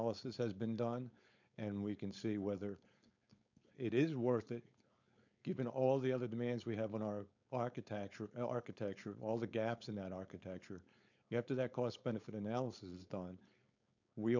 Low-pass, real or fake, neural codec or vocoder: 7.2 kHz; fake; codec, 16 kHz, 4.8 kbps, FACodec